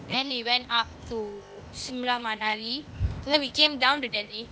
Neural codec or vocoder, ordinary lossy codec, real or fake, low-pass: codec, 16 kHz, 0.8 kbps, ZipCodec; none; fake; none